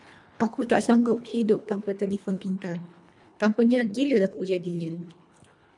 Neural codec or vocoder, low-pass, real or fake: codec, 24 kHz, 1.5 kbps, HILCodec; 10.8 kHz; fake